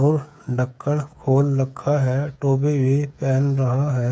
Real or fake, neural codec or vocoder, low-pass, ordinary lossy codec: fake; codec, 16 kHz, 8 kbps, FreqCodec, smaller model; none; none